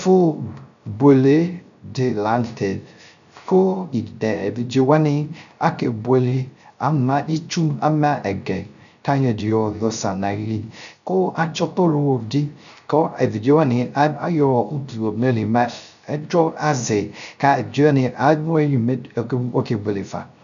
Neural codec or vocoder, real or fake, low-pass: codec, 16 kHz, 0.3 kbps, FocalCodec; fake; 7.2 kHz